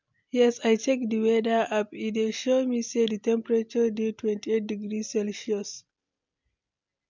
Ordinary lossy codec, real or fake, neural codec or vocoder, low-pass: MP3, 64 kbps; real; none; 7.2 kHz